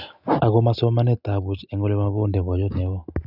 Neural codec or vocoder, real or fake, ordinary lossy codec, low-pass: none; real; none; 5.4 kHz